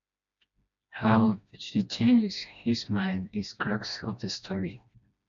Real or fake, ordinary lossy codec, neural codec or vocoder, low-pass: fake; MP3, 64 kbps; codec, 16 kHz, 1 kbps, FreqCodec, smaller model; 7.2 kHz